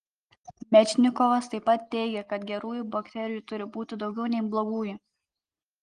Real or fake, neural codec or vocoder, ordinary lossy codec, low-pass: real; none; Opus, 24 kbps; 9.9 kHz